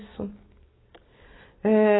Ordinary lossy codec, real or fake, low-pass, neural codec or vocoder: AAC, 16 kbps; real; 7.2 kHz; none